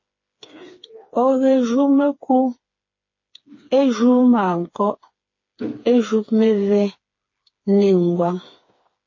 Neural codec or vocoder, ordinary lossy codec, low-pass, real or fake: codec, 16 kHz, 4 kbps, FreqCodec, smaller model; MP3, 32 kbps; 7.2 kHz; fake